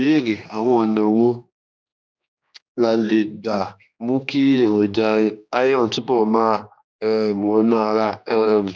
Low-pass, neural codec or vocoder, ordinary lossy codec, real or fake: none; codec, 16 kHz, 2 kbps, X-Codec, HuBERT features, trained on general audio; none; fake